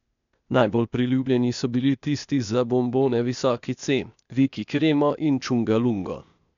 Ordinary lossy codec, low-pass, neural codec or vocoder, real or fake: none; 7.2 kHz; codec, 16 kHz, 0.8 kbps, ZipCodec; fake